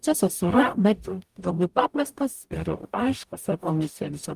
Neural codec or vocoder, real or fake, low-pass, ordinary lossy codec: codec, 44.1 kHz, 0.9 kbps, DAC; fake; 14.4 kHz; Opus, 32 kbps